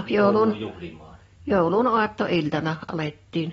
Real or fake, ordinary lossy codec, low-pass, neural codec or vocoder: real; AAC, 24 kbps; 19.8 kHz; none